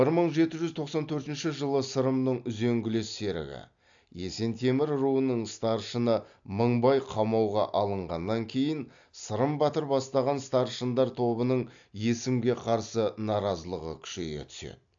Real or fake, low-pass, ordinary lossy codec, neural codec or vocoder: real; 7.2 kHz; AAC, 64 kbps; none